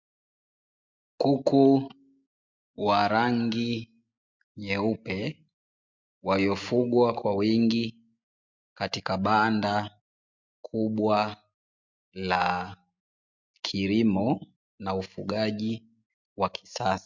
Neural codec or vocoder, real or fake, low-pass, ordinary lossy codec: none; real; 7.2 kHz; MP3, 64 kbps